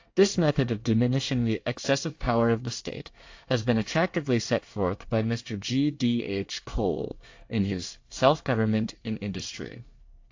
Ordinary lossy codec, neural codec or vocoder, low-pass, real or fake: AAC, 48 kbps; codec, 24 kHz, 1 kbps, SNAC; 7.2 kHz; fake